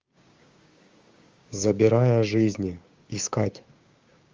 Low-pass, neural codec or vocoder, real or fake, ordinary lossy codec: 7.2 kHz; codec, 16 kHz, 6 kbps, DAC; fake; Opus, 32 kbps